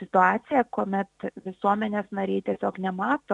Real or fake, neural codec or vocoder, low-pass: real; none; 10.8 kHz